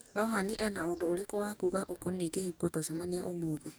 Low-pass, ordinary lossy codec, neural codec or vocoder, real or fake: none; none; codec, 44.1 kHz, 2.6 kbps, DAC; fake